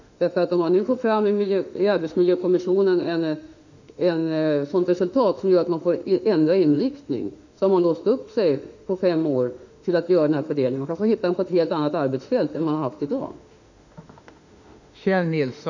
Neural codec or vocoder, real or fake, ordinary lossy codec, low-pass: autoencoder, 48 kHz, 32 numbers a frame, DAC-VAE, trained on Japanese speech; fake; none; 7.2 kHz